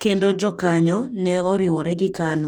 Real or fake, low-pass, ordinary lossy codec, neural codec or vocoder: fake; 19.8 kHz; none; codec, 44.1 kHz, 2.6 kbps, DAC